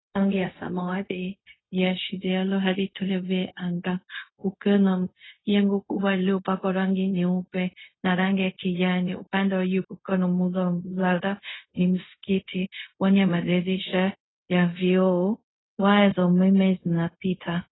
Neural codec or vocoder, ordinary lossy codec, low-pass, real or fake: codec, 16 kHz, 0.4 kbps, LongCat-Audio-Codec; AAC, 16 kbps; 7.2 kHz; fake